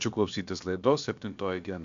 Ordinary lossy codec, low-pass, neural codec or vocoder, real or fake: MP3, 64 kbps; 7.2 kHz; codec, 16 kHz, about 1 kbps, DyCAST, with the encoder's durations; fake